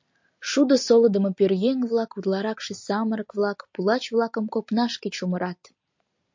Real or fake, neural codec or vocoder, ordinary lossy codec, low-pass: real; none; MP3, 48 kbps; 7.2 kHz